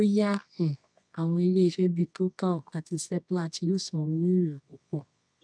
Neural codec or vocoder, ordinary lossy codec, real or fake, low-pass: codec, 24 kHz, 0.9 kbps, WavTokenizer, medium music audio release; none; fake; 9.9 kHz